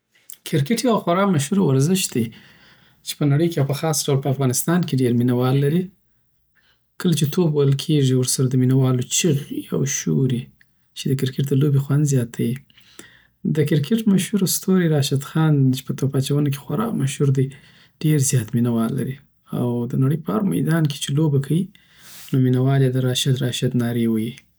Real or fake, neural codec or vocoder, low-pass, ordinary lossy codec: real; none; none; none